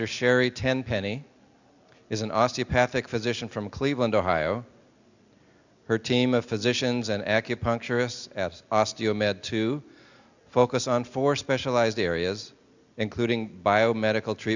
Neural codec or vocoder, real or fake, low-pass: none; real; 7.2 kHz